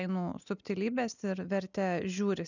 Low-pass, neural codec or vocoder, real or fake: 7.2 kHz; none; real